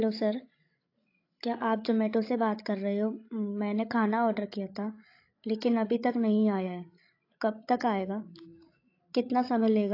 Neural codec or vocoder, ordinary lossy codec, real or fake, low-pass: codec, 16 kHz, 16 kbps, FreqCodec, larger model; AAC, 32 kbps; fake; 5.4 kHz